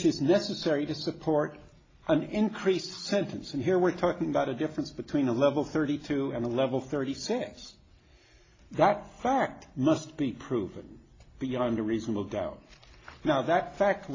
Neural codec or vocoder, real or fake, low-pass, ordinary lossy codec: none; real; 7.2 kHz; AAC, 32 kbps